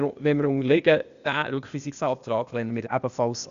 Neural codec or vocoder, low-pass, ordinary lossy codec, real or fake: codec, 16 kHz, 0.8 kbps, ZipCodec; 7.2 kHz; Opus, 64 kbps; fake